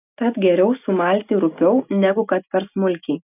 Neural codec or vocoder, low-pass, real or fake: none; 3.6 kHz; real